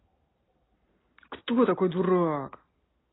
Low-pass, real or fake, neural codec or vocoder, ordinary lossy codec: 7.2 kHz; real; none; AAC, 16 kbps